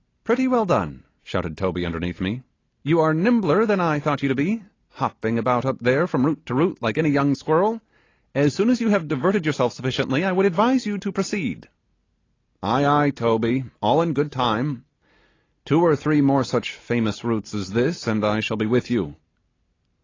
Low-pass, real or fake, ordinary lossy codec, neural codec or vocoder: 7.2 kHz; real; AAC, 32 kbps; none